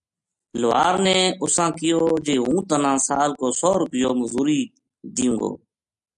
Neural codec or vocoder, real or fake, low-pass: none; real; 10.8 kHz